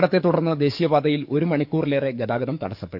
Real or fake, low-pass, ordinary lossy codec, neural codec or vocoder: fake; 5.4 kHz; none; codec, 44.1 kHz, 7.8 kbps, DAC